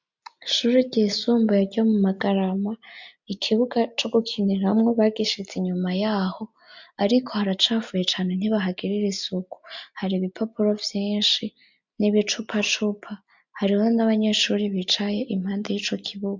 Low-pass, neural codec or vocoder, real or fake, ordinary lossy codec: 7.2 kHz; none; real; MP3, 64 kbps